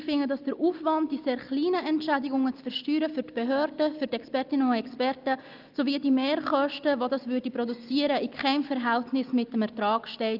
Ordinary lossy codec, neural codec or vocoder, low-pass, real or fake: Opus, 24 kbps; none; 5.4 kHz; real